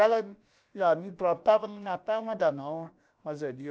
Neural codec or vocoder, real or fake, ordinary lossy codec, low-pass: codec, 16 kHz, about 1 kbps, DyCAST, with the encoder's durations; fake; none; none